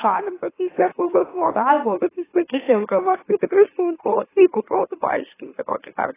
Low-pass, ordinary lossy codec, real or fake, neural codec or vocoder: 3.6 kHz; AAC, 16 kbps; fake; autoencoder, 44.1 kHz, a latent of 192 numbers a frame, MeloTTS